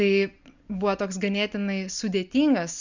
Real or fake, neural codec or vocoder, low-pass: real; none; 7.2 kHz